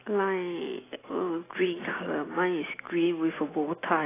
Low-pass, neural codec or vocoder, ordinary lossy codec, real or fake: 3.6 kHz; none; AAC, 16 kbps; real